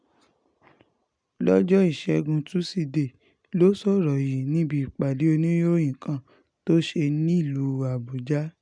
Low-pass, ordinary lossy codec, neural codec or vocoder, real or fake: 9.9 kHz; none; none; real